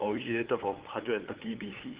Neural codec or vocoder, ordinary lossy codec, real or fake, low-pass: codec, 16 kHz, 8 kbps, FunCodec, trained on LibriTTS, 25 frames a second; Opus, 16 kbps; fake; 3.6 kHz